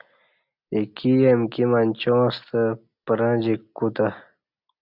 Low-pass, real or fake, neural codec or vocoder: 5.4 kHz; real; none